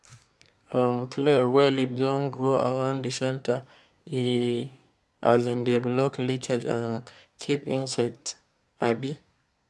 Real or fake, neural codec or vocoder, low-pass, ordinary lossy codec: fake; codec, 24 kHz, 1 kbps, SNAC; none; none